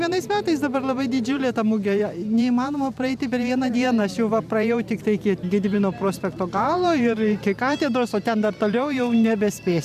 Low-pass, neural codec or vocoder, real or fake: 14.4 kHz; vocoder, 44.1 kHz, 128 mel bands every 512 samples, BigVGAN v2; fake